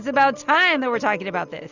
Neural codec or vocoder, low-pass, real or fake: none; 7.2 kHz; real